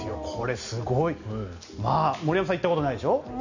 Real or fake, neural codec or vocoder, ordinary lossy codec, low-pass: real; none; none; 7.2 kHz